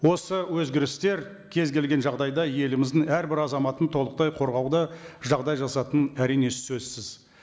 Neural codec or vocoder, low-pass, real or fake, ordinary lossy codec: none; none; real; none